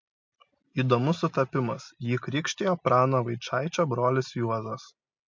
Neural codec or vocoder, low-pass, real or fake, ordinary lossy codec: none; 7.2 kHz; real; MP3, 64 kbps